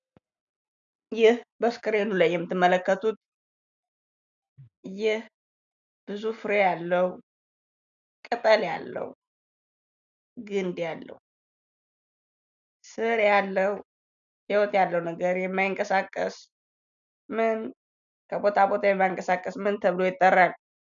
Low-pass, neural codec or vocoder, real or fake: 7.2 kHz; none; real